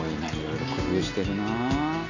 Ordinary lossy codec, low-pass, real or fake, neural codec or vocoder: none; 7.2 kHz; real; none